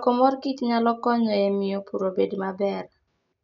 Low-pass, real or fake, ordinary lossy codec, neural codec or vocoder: 7.2 kHz; real; none; none